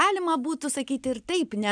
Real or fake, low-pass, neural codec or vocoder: real; 9.9 kHz; none